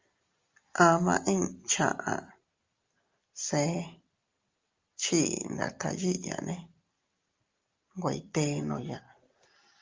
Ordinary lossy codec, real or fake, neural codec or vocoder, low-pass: Opus, 32 kbps; real; none; 7.2 kHz